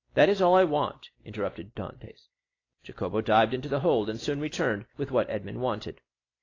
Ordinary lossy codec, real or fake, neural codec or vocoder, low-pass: AAC, 32 kbps; real; none; 7.2 kHz